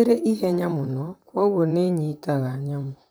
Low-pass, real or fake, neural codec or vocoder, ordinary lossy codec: none; fake; vocoder, 44.1 kHz, 128 mel bands, Pupu-Vocoder; none